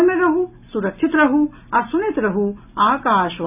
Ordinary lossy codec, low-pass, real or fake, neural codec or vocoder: none; 3.6 kHz; real; none